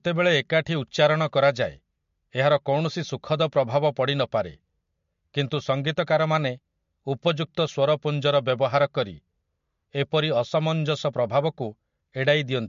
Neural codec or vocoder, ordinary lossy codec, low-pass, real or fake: none; MP3, 48 kbps; 7.2 kHz; real